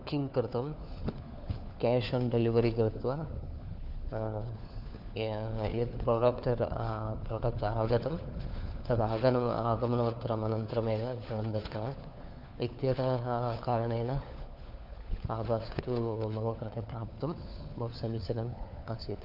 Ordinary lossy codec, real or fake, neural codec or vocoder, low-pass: none; fake; codec, 16 kHz, 4 kbps, FunCodec, trained on LibriTTS, 50 frames a second; 5.4 kHz